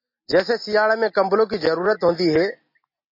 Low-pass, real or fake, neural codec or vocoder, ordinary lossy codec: 5.4 kHz; real; none; MP3, 24 kbps